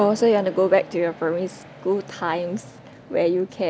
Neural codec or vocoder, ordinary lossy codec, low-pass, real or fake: none; none; none; real